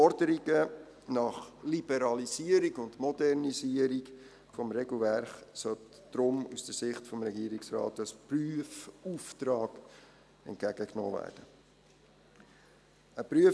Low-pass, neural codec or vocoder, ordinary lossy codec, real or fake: none; none; none; real